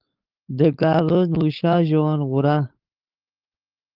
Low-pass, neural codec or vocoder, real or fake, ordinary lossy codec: 5.4 kHz; codec, 16 kHz, 4.8 kbps, FACodec; fake; Opus, 32 kbps